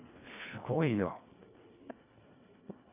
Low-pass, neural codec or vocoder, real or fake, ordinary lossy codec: 3.6 kHz; codec, 24 kHz, 1.5 kbps, HILCodec; fake; none